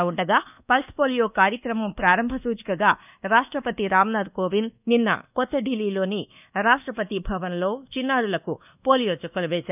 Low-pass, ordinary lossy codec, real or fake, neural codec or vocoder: 3.6 kHz; none; fake; codec, 24 kHz, 1.2 kbps, DualCodec